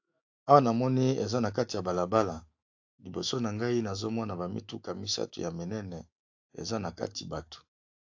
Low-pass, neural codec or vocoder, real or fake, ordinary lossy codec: 7.2 kHz; autoencoder, 48 kHz, 128 numbers a frame, DAC-VAE, trained on Japanese speech; fake; AAC, 48 kbps